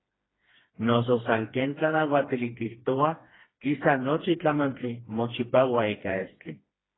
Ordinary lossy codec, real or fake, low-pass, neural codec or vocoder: AAC, 16 kbps; fake; 7.2 kHz; codec, 16 kHz, 2 kbps, FreqCodec, smaller model